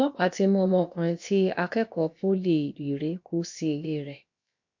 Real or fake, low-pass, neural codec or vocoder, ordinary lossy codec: fake; 7.2 kHz; codec, 16 kHz, about 1 kbps, DyCAST, with the encoder's durations; MP3, 48 kbps